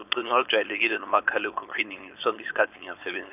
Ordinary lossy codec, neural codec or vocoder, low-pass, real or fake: none; codec, 16 kHz, 4.8 kbps, FACodec; 3.6 kHz; fake